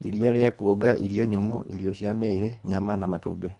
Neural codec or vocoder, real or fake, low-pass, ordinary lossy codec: codec, 24 kHz, 1.5 kbps, HILCodec; fake; 10.8 kHz; none